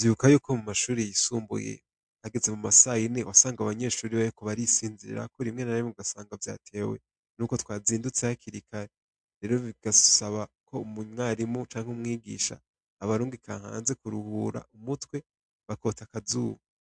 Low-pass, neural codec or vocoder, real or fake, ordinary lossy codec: 9.9 kHz; none; real; MP3, 64 kbps